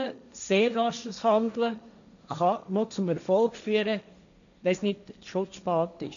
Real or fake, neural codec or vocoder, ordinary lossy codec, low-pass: fake; codec, 16 kHz, 1.1 kbps, Voila-Tokenizer; none; 7.2 kHz